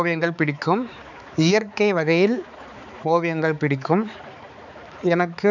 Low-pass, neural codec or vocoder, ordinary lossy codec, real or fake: 7.2 kHz; codec, 16 kHz, 4 kbps, X-Codec, HuBERT features, trained on balanced general audio; none; fake